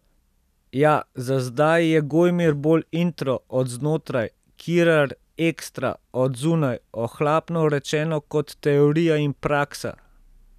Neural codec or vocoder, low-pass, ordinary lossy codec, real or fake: none; 14.4 kHz; none; real